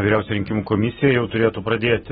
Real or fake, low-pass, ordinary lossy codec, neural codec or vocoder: real; 14.4 kHz; AAC, 16 kbps; none